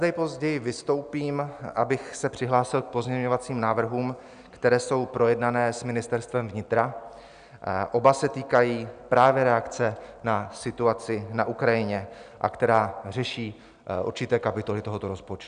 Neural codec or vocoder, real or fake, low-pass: none; real; 9.9 kHz